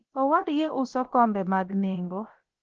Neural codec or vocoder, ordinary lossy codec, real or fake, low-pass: codec, 16 kHz, about 1 kbps, DyCAST, with the encoder's durations; Opus, 16 kbps; fake; 7.2 kHz